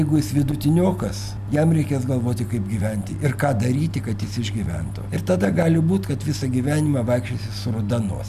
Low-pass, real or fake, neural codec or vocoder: 14.4 kHz; real; none